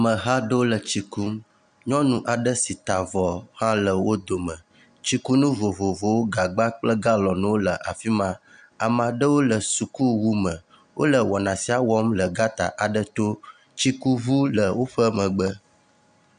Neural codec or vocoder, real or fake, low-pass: none; real; 9.9 kHz